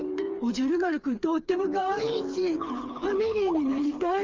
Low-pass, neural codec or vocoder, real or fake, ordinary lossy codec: 7.2 kHz; codec, 16 kHz, 4 kbps, FreqCodec, smaller model; fake; Opus, 32 kbps